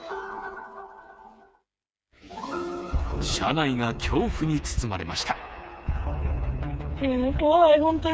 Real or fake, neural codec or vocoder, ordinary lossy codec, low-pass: fake; codec, 16 kHz, 4 kbps, FreqCodec, smaller model; none; none